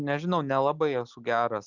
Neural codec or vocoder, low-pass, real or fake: none; 7.2 kHz; real